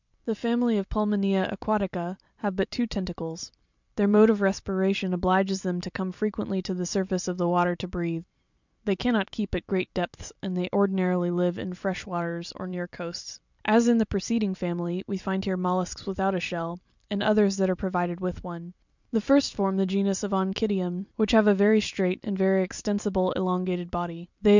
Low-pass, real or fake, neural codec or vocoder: 7.2 kHz; fake; vocoder, 44.1 kHz, 128 mel bands every 256 samples, BigVGAN v2